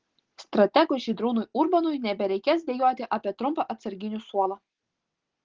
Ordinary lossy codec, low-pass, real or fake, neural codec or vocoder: Opus, 16 kbps; 7.2 kHz; real; none